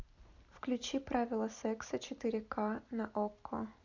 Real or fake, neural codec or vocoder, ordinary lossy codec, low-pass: real; none; MP3, 48 kbps; 7.2 kHz